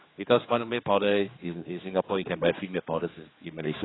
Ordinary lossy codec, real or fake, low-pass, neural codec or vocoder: AAC, 16 kbps; fake; 7.2 kHz; codec, 16 kHz, 4 kbps, X-Codec, HuBERT features, trained on general audio